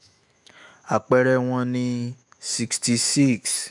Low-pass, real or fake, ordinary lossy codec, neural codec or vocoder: 10.8 kHz; fake; none; autoencoder, 48 kHz, 128 numbers a frame, DAC-VAE, trained on Japanese speech